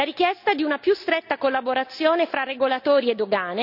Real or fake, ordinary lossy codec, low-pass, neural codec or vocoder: real; none; 5.4 kHz; none